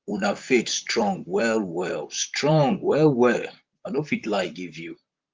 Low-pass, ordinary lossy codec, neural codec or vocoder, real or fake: 7.2 kHz; Opus, 32 kbps; vocoder, 44.1 kHz, 128 mel bands, Pupu-Vocoder; fake